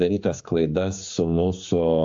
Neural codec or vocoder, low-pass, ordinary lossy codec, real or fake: codec, 16 kHz, 4 kbps, X-Codec, HuBERT features, trained on general audio; 7.2 kHz; AAC, 48 kbps; fake